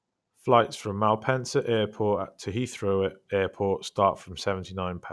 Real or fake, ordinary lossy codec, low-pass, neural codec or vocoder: real; none; none; none